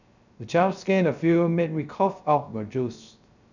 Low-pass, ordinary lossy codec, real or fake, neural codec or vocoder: 7.2 kHz; none; fake; codec, 16 kHz, 0.3 kbps, FocalCodec